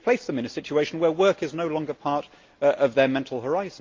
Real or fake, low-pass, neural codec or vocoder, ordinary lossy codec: real; 7.2 kHz; none; Opus, 24 kbps